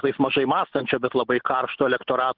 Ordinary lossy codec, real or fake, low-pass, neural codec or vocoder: Opus, 16 kbps; real; 5.4 kHz; none